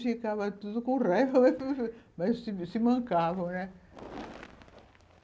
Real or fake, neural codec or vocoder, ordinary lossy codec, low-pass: real; none; none; none